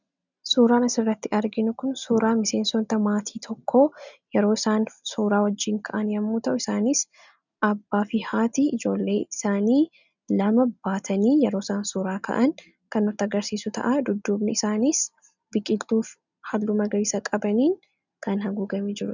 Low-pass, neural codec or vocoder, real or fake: 7.2 kHz; none; real